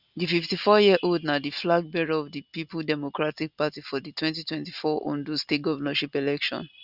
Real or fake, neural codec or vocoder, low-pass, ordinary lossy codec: real; none; 5.4 kHz; Opus, 64 kbps